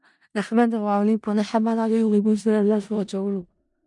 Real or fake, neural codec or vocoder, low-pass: fake; codec, 16 kHz in and 24 kHz out, 0.4 kbps, LongCat-Audio-Codec, four codebook decoder; 10.8 kHz